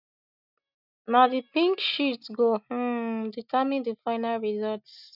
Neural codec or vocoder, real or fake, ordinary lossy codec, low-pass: none; real; none; 5.4 kHz